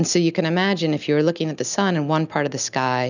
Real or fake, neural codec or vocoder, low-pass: real; none; 7.2 kHz